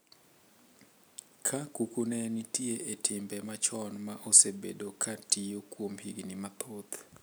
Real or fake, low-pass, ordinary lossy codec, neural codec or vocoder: real; none; none; none